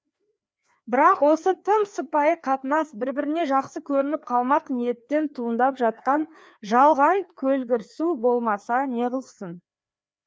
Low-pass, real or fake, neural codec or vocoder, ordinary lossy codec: none; fake; codec, 16 kHz, 2 kbps, FreqCodec, larger model; none